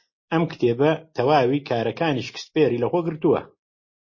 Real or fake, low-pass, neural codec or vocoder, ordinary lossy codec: real; 7.2 kHz; none; MP3, 32 kbps